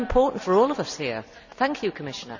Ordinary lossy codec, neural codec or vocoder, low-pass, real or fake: none; none; 7.2 kHz; real